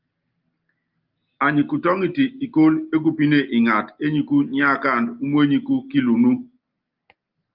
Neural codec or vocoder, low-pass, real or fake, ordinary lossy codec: none; 5.4 kHz; real; Opus, 32 kbps